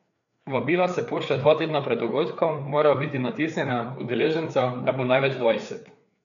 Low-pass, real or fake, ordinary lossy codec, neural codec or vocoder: 7.2 kHz; fake; none; codec, 16 kHz, 4 kbps, FreqCodec, larger model